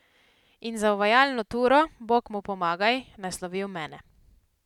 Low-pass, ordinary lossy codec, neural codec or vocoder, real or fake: 19.8 kHz; none; none; real